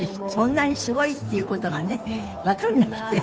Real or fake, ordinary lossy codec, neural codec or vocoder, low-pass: fake; none; codec, 16 kHz, 2 kbps, FunCodec, trained on Chinese and English, 25 frames a second; none